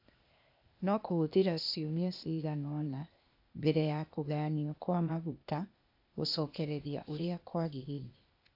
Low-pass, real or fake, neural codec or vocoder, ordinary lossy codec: 5.4 kHz; fake; codec, 16 kHz, 0.8 kbps, ZipCodec; AAC, 48 kbps